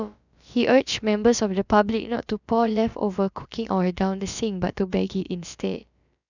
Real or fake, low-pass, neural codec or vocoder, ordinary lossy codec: fake; 7.2 kHz; codec, 16 kHz, about 1 kbps, DyCAST, with the encoder's durations; none